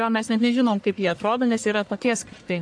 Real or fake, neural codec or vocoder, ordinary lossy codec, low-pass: fake; codec, 44.1 kHz, 1.7 kbps, Pupu-Codec; AAC, 64 kbps; 9.9 kHz